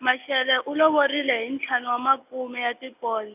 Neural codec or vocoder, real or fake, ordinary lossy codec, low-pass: none; real; AAC, 32 kbps; 3.6 kHz